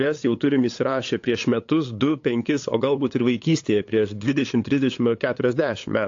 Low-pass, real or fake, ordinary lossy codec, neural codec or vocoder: 7.2 kHz; fake; AAC, 48 kbps; codec, 16 kHz, 8 kbps, FunCodec, trained on LibriTTS, 25 frames a second